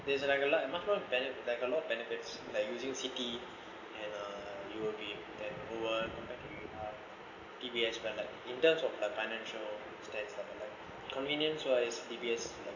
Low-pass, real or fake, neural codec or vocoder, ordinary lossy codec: 7.2 kHz; real; none; none